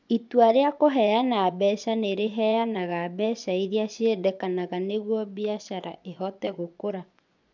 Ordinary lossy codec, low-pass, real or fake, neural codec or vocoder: none; 7.2 kHz; fake; vocoder, 24 kHz, 100 mel bands, Vocos